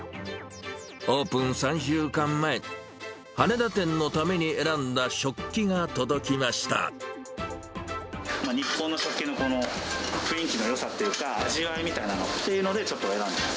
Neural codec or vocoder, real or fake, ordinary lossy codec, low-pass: none; real; none; none